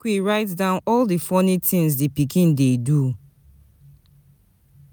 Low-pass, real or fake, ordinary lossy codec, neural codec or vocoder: none; real; none; none